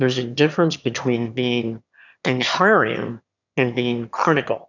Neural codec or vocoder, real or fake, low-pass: autoencoder, 22.05 kHz, a latent of 192 numbers a frame, VITS, trained on one speaker; fake; 7.2 kHz